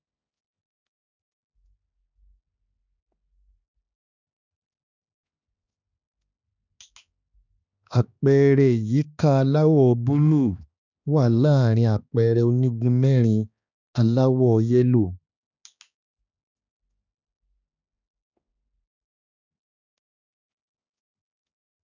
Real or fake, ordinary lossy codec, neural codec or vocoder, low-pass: fake; none; codec, 16 kHz, 2 kbps, X-Codec, HuBERT features, trained on balanced general audio; 7.2 kHz